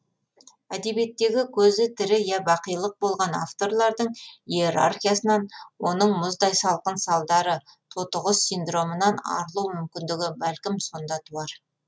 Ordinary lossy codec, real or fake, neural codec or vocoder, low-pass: none; real; none; none